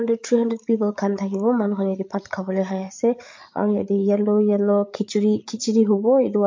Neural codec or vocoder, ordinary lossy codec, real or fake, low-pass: codec, 16 kHz, 8 kbps, FreqCodec, larger model; MP3, 48 kbps; fake; 7.2 kHz